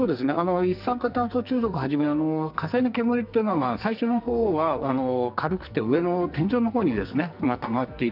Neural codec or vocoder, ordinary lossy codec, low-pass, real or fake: codec, 44.1 kHz, 2.6 kbps, SNAC; none; 5.4 kHz; fake